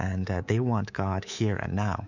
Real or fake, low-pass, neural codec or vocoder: fake; 7.2 kHz; codec, 24 kHz, 3.1 kbps, DualCodec